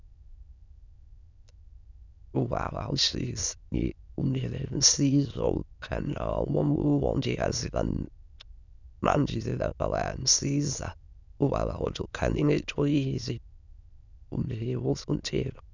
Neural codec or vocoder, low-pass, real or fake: autoencoder, 22.05 kHz, a latent of 192 numbers a frame, VITS, trained on many speakers; 7.2 kHz; fake